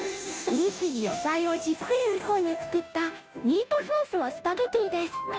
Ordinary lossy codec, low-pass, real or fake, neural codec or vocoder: none; none; fake; codec, 16 kHz, 0.5 kbps, FunCodec, trained on Chinese and English, 25 frames a second